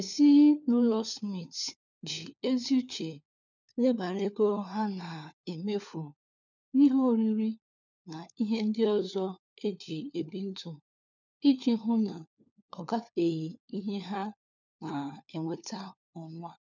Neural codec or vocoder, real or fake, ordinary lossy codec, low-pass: codec, 16 kHz, 4 kbps, FunCodec, trained on LibriTTS, 50 frames a second; fake; none; 7.2 kHz